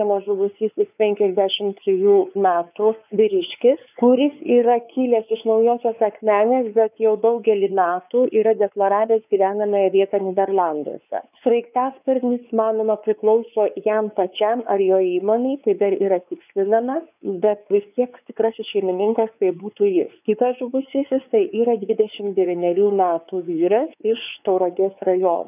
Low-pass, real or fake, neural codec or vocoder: 3.6 kHz; fake; codec, 16 kHz, 4 kbps, X-Codec, WavLM features, trained on Multilingual LibriSpeech